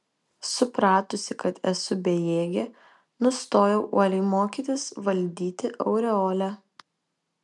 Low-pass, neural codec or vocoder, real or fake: 10.8 kHz; none; real